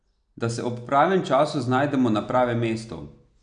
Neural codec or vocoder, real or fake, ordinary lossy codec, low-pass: none; real; none; 9.9 kHz